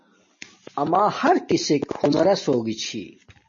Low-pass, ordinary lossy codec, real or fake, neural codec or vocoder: 7.2 kHz; MP3, 32 kbps; real; none